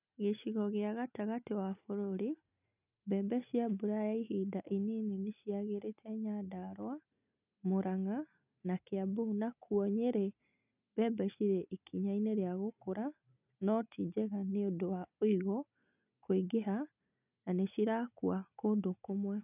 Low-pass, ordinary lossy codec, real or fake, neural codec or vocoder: 3.6 kHz; none; real; none